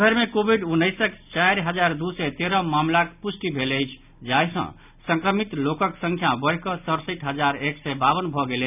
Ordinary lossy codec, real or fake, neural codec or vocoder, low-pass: none; real; none; 3.6 kHz